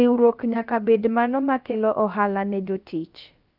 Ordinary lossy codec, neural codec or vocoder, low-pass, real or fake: Opus, 24 kbps; codec, 16 kHz, about 1 kbps, DyCAST, with the encoder's durations; 5.4 kHz; fake